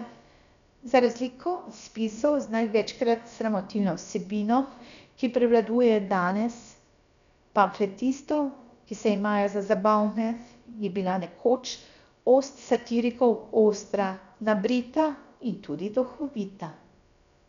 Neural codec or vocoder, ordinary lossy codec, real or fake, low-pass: codec, 16 kHz, about 1 kbps, DyCAST, with the encoder's durations; MP3, 96 kbps; fake; 7.2 kHz